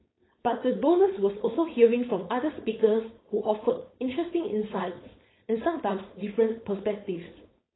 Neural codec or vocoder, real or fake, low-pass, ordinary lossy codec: codec, 16 kHz, 4.8 kbps, FACodec; fake; 7.2 kHz; AAC, 16 kbps